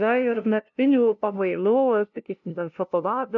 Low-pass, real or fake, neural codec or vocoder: 7.2 kHz; fake; codec, 16 kHz, 0.5 kbps, FunCodec, trained on LibriTTS, 25 frames a second